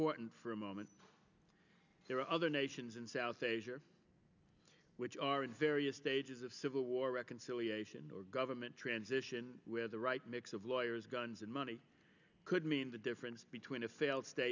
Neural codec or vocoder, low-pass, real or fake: none; 7.2 kHz; real